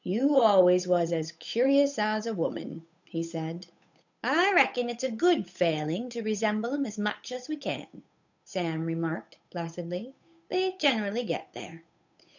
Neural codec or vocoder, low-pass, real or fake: codec, 16 kHz, 8 kbps, FunCodec, trained on Chinese and English, 25 frames a second; 7.2 kHz; fake